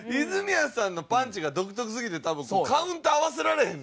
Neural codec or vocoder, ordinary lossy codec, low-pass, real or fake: none; none; none; real